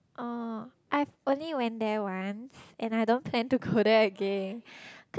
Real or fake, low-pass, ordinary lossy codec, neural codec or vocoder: real; none; none; none